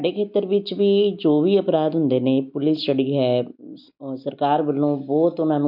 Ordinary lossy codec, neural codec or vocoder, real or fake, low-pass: MP3, 48 kbps; none; real; 5.4 kHz